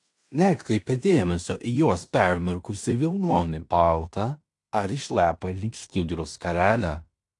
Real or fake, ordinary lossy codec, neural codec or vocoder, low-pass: fake; AAC, 64 kbps; codec, 16 kHz in and 24 kHz out, 0.9 kbps, LongCat-Audio-Codec, fine tuned four codebook decoder; 10.8 kHz